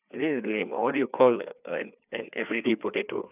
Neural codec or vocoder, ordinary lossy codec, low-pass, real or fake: codec, 16 kHz, 2 kbps, FreqCodec, larger model; none; 3.6 kHz; fake